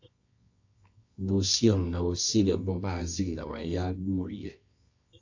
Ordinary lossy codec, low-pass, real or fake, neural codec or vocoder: AAC, 48 kbps; 7.2 kHz; fake; codec, 24 kHz, 0.9 kbps, WavTokenizer, medium music audio release